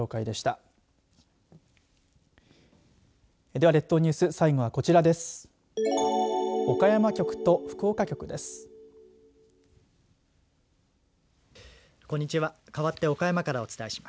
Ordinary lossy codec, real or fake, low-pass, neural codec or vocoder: none; real; none; none